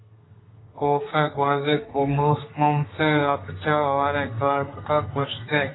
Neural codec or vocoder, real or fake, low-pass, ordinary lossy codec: codec, 32 kHz, 1.9 kbps, SNAC; fake; 7.2 kHz; AAC, 16 kbps